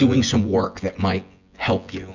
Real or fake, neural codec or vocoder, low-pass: fake; vocoder, 24 kHz, 100 mel bands, Vocos; 7.2 kHz